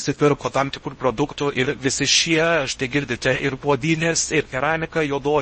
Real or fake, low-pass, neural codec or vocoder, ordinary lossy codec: fake; 10.8 kHz; codec, 16 kHz in and 24 kHz out, 0.6 kbps, FocalCodec, streaming, 4096 codes; MP3, 32 kbps